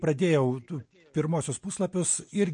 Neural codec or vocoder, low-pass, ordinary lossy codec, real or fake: none; 9.9 kHz; MP3, 48 kbps; real